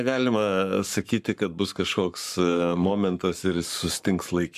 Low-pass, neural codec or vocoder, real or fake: 14.4 kHz; codec, 44.1 kHz, 7.8 kbps, Pupu-Codec; fake